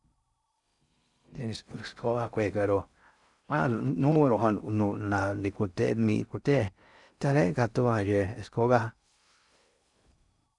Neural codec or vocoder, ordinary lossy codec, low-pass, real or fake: codec, 16 kHz in and 24 kHz out, 0.6 kbps, FocalCodec, streaming, 4096 codes; none; 10.8 kHz; fake